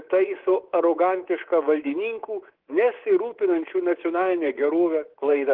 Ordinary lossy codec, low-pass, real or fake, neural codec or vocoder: Opus, 16 kbps; 5.4 kHz; fake; codec, 24 kHz, 3.1 kbps, DualCodec